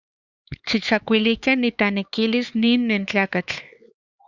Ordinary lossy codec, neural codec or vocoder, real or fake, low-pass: Opus, 64 kbps; codec, 16 kHz, 4 kbps, X-Codec, HuBERT features, trained on LibriSpeech; fake; 7.2 kHz